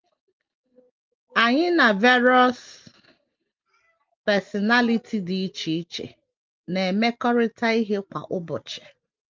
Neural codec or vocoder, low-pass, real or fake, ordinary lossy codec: none; 7.2 kHz; real; Opus, 32 kbps